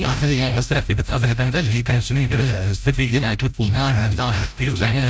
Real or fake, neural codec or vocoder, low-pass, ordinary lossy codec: fake; codec, 16 kHz, 0.5 kbps, FreqCodec, larger model; none; none